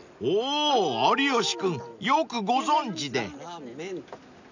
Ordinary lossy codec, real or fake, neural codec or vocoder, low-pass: none; real; none; 7.2 kHz